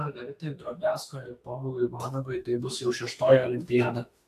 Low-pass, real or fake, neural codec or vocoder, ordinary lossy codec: 14.4 kHz; fake; autoencoder, 48 kHz, 32 numbers a frame, DAC-VAE, trained on Japanese speech; AAC, 64 kbps